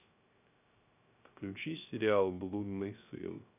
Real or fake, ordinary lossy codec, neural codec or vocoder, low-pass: fake; none; codec, 16 kHz, 0.3 kbps, FocalCodec; 3.6 kHz